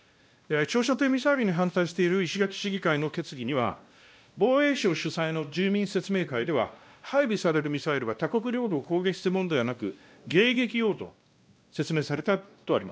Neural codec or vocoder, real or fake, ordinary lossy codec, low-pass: codec, 16 kHz, 1 kbps, X-Codec, WavLM features, trained on Multilingual LibriSpeech; fake; none; none